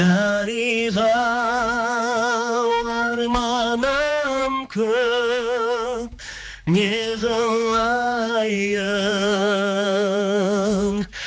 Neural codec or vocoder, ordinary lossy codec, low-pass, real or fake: codec, 16 kHz, 2 kbps, X-Codec, HuBERT features, trained on general audio; none; none; fake